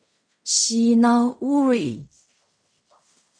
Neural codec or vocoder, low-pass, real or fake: codec, 16 kHz in and 24 kHz out, 0.4 kbps, LongCat-Audio-Codec, fine tuned four codebook decoder; 9.9 kHz; fake